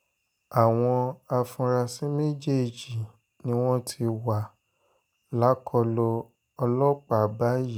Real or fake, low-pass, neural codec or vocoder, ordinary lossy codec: real; none; none; none